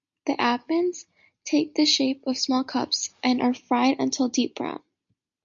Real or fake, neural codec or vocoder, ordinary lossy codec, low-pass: real; none; MP3, 48 kbps; 7.2 kHz